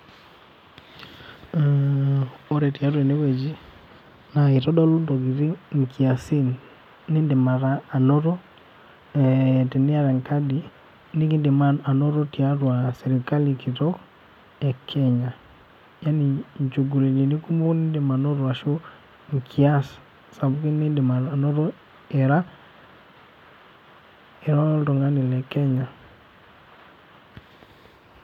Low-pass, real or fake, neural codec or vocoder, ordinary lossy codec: 19.8 kHz; real; none; MP3, 96 kbps